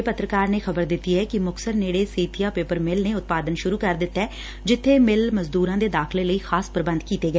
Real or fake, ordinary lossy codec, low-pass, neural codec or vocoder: real; none; none; none